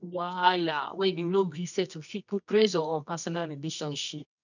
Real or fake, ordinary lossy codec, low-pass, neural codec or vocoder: fake; none; 7.2 kHz; codec, 24 kHz, 0.9 kbps, WavTokenizer, medium music audio release